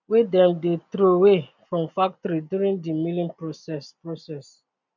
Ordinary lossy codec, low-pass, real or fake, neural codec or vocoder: none; 7.2 kHz; real; none